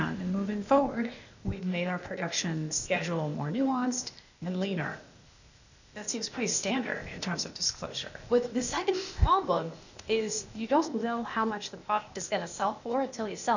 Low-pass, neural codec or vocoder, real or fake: 7.2 kHz; codec, 16 kHz, 0.8 kbps, ZipCodec; fake